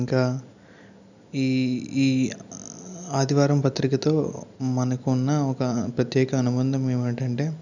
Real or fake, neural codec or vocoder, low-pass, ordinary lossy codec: real; none; 7.2 kHz; none